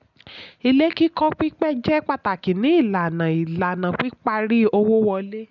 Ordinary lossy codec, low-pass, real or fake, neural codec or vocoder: none; 7.2 kHz; real; none